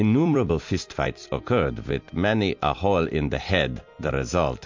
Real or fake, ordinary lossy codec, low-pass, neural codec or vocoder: fake; MP3, 48 kbps; 7.2 kHz; vocoder, 44.1 kHz, 128 mel bands every 256 samples, BigVGAN v2